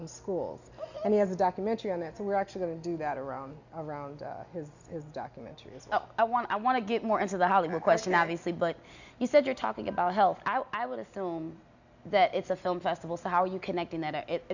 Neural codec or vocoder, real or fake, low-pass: none; real; 7.2 kHz